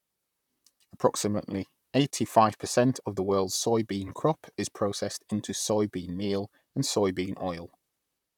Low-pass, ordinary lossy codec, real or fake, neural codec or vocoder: 19.8 kHz; none; fake; vocoder, 44.1 kHz, 128 mel bands, Pupu-Vocoder